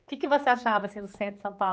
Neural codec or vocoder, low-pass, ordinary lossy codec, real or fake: codec, 16 kHz, 4 kbps, X-Codec, HuBERT features, trained on general audio; none; none; fake